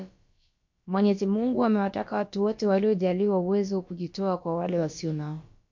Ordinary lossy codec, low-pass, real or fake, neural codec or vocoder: MP3, 48 kbps; 7.2 kHz; fake; codec, 16 kHz, about 1 kbps, DyCAST, with the encoder's durations